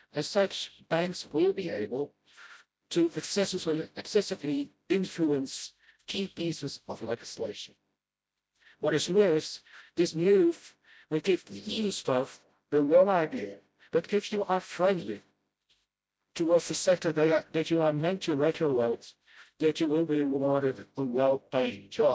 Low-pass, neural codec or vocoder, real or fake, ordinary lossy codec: none; codec, 16 kHz, 0.5 kbps, FreqCodec, smaller model; fake; none